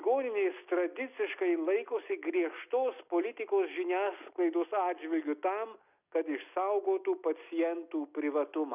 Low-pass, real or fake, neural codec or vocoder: 3.6 kHz; real; none